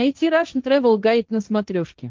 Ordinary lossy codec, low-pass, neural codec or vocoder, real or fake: Opus, 24 kbps; 7.2 kHz; codec, 16 kHz, 1.1 kbps, Voila-Tokenizer; fake